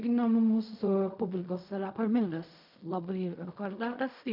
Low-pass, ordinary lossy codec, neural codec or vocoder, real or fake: 5.4 kHz; MP3, 48 kbps; codec, 16 kHz in and 24 kHz out, 0.4 kbps, LongCat-Audio-Codec, fine tuned four codebook decoder; fake